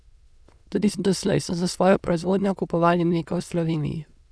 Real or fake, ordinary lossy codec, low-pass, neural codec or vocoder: fake; none; none; autoencoder, 22.05 kHz, a latent of 192 numbers a frame, VITS, trained on many speakers